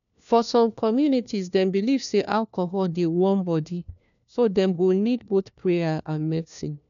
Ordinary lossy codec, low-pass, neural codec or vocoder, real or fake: none; 7.2 kHz; codec, 16 kHz, 1 kbps, FunCodec, trained on LibriTTS, 50 frames a second; fake